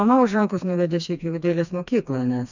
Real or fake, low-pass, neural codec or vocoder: fake; 7.2 kHz; codec, 16 kHz, 2 kbps, FreqCodec, smaller model